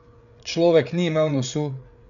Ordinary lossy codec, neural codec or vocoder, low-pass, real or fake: none; codec, 16 kHz, 8 kbps, FreqCodec, larger model; 7.2 kHz; fake